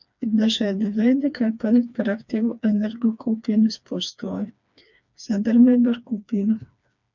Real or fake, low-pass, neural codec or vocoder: fake; 7.2 kHz; codec, 16 kHz, 2 kbps, FreqCodec, smaller model